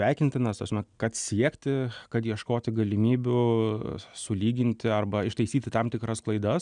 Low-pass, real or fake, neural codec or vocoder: 9.9 kHz; real; none